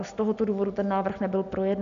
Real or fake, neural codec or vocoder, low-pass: real; none; 7.2 kHz